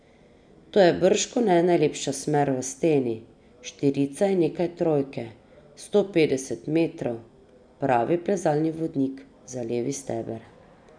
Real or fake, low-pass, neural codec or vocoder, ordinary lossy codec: real; 9.9 kHz; none; none